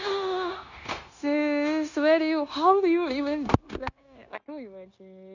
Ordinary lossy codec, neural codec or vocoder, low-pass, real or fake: none; codec, 16 kHz, 0.9 kbps, LongCat-Audio-Codec; 7.2 kHz; fake